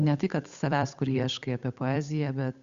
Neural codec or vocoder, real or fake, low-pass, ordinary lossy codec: codec, 16 kHz, 8 kbps, FunCodec, trained on Chinese and English, 25 frames a second; fake; 7.2 kHz; Opus, 64 kbps